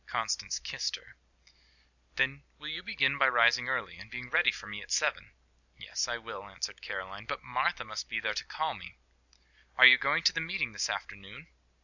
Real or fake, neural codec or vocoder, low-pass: real; none; 7.2 kHz